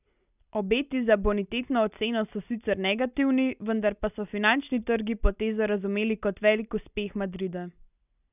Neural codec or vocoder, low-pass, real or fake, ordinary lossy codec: none; 3.6 kHz; real; none